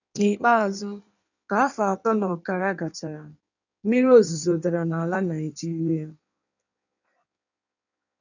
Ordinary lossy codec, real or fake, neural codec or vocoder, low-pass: none; fake; codec, 16 kHz in and 24 kHz out, 1.1 kbps, FireRedTTS-2 codec; 7.2 kHz